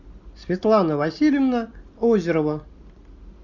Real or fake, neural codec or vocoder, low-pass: real; none; 7.2 kHz